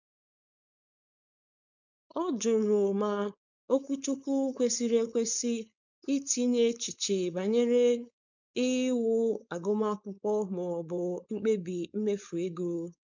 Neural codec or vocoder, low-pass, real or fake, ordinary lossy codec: codec, 16 kHz, 4.8 kbps, FACodec; 7.2 kHz; fake; none